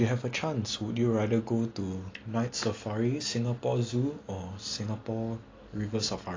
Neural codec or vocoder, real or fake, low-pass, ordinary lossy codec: none; real; 7.2 kHz; AAC, 32 kbps